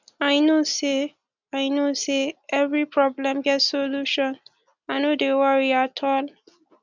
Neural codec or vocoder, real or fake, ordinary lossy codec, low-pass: none; real; none; 7.2 kHz